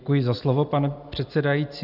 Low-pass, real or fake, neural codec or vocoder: 5.4 kHz; real; none